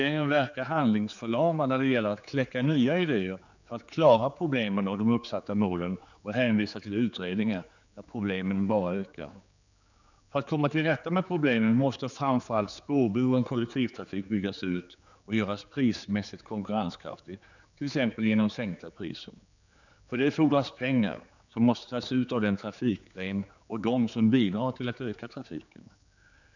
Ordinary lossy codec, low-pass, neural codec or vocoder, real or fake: none; 7.2 kHz; codec, 16 kHz, 4 kbps, X-Codec, HuBERT features, trained on general audio; fake